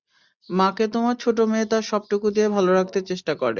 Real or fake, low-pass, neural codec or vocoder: real; 7.2 kHz; none